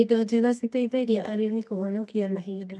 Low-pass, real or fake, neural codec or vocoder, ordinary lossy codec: none; fake; codec, 24 kHz, 0.9 kbps, WavTokenizer, medium music audio release; none